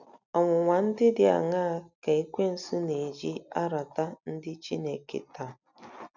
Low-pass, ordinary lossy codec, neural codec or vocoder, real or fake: 7.2 kHz; none; none; real